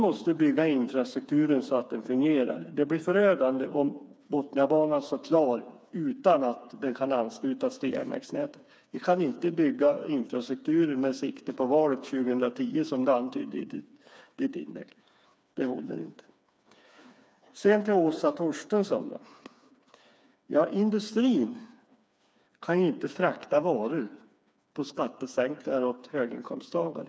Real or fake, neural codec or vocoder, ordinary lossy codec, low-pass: fake; codec, 16 kHz, 4 kbps, FreqCodec, smaller model; none; none